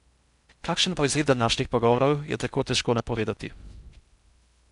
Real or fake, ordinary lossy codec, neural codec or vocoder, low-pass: fake; none; codec, 16 kHz in and 24 kHz out, 0.6 kbps, FocalCodec, streaming, 4096 codes; 10.8 kHz